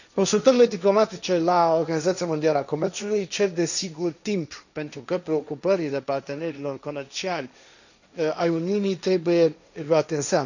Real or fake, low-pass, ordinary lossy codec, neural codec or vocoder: fake; 7.2 kHz; none; codec, 16 kHz, 1.1 kbps, Voila-Tokenizer